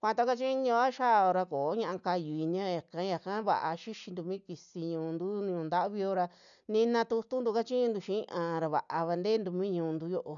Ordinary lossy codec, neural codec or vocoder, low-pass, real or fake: none; none; 7.2 kHz; real